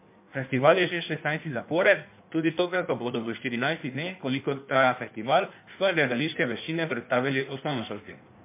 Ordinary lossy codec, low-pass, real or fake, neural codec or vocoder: MP3, 32 kbps; 3.6 kHz; fake; codec, 16 kHz in and 24 kHz out, 1.1 kbps, FireRedTTS-2 codec